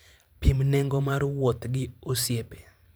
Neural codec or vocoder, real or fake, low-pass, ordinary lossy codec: vocoder, 44.1 kHz, 128 mel bands every 512 samples, BigVGAN v2; fake; none; none